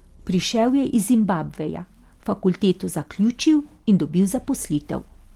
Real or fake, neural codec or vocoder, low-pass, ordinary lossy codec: real; none; 19.8 kHz; Opus, 24 kbps